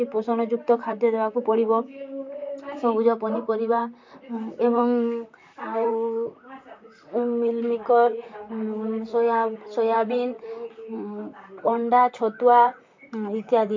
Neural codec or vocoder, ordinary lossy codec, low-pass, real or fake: vocoder, 44.1 kHz, 128 mel bands, Pupu-Vocoder; MP3, 48 kbps; 7.2 kHz; fake